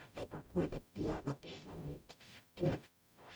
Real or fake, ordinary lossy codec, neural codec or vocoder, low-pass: fake; none; codec, 44.1 kHz, 0.9 kbps, DAC; none